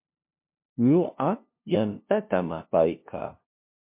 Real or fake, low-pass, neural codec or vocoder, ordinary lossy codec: fake; 3.6 kHz; codec, 16 kHz, 0.5 kbps, FunCodec, trained on LibriTTS, 25 frames a second; MP3, 32 kbps